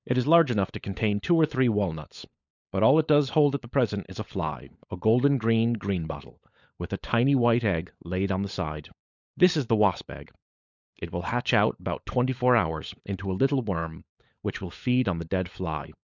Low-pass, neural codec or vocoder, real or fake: 7.2 kHz; codec, 16 kHz, 16 kbps, FunCodec, trained on LibriTTS, 50 frames a second; fake